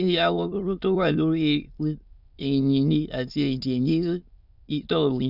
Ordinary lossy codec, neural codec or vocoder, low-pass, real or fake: none; autoencoder, 22.05 kHz, a latent of 192 numbers a frame, VITS, trained on many speakers; 5.4 kHz; fake